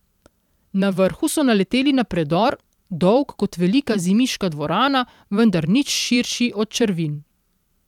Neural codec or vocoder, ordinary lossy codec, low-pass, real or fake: vocoder, 44.1 kHz, 128 mel bands every 512 samples, BigVGAN v2; none; 19.8 kHz; fake